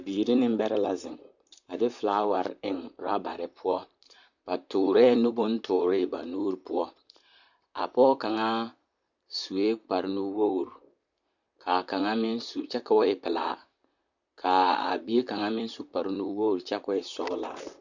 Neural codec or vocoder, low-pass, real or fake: vocoder, 44.1 kHz, 128 mel bands, Pupu-Vocoder; 7.2 kHz; fake